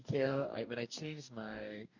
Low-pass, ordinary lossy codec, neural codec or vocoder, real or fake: 7.2 kHz; none; codec, 44.1 kHz, 2.6 kbps, DAC; fake